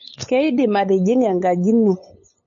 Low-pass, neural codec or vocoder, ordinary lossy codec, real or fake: 7.2 kHz; codec, 16 kHz, 8 kbps, FunCodec, trained on LibriTTS, 25 frames a second; MP3, 32 kbps; fake